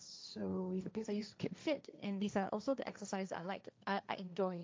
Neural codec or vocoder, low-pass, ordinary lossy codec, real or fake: codec, 16 kHz, 1.1 kbps, Voila-Tokenizer; none; none; fake